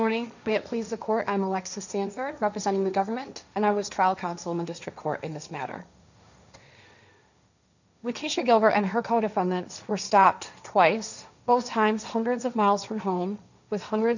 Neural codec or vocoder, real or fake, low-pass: codec, 16 kHz, 1.1 kbps, Voila-Tokenizer; fake; 7.2 kHz